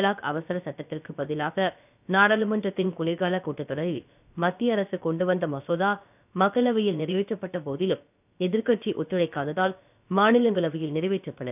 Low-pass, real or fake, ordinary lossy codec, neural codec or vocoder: 3.6 kHz; fake; none; codec, 16 kHz, about 1 kbps, DyCAST, with the encoder's durations